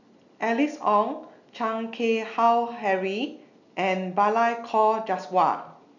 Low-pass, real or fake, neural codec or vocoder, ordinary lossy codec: 7.2 kHz; real; none; none